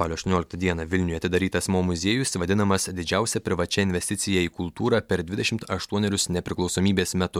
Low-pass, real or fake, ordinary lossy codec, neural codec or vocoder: 19.8 kHz; real; MP3, 96 kbps; none